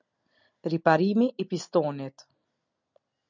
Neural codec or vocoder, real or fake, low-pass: none; real; 7.2 kHz